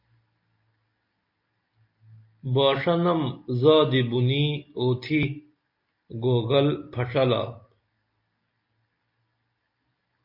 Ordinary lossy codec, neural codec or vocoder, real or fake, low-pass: MP3, 32 kbps; none; real; 5.4 kHz